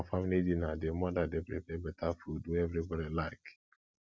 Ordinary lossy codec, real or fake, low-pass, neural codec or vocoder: none; real; none; none